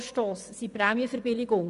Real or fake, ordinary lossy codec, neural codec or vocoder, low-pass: fake; none; vocoder, 24 kHz, 100 mel bands, Vocos; 10.8 kHz